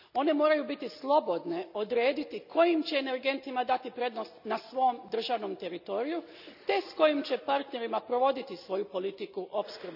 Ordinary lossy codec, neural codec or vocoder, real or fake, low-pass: none; none; real; 5.4 kHz